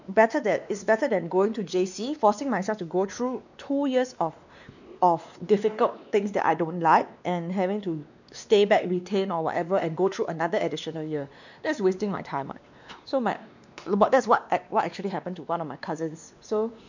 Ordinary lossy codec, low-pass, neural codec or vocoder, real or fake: none; 7.2 kHz; codec, 16 kHz, 2 kbps, X-Codec, WavLM features, trained on Multilingual LibriSpeech; fake